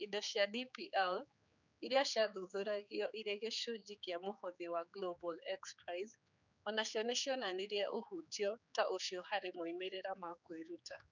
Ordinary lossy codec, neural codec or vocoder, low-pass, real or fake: none; codec, 16 kHz, 4 kbps, X-Codec, HuBERT features, trained on general audio; 7.2 kHz; fake